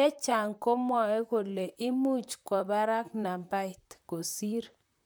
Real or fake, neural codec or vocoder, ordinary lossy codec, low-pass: fake; vocoder, 44.1 kHz, 128 mel bands, Pupu-Vocoder; none; none